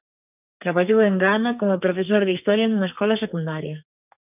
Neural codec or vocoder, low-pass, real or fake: codec, 32 kHz, 1.9 kbps, SNAC; 3.6 kHz; fake